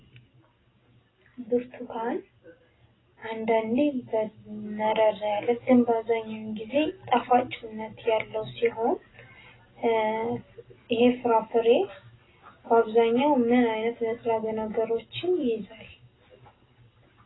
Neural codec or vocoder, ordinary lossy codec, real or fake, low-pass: none; AAC, 16 kbps; real; 7.2 kHz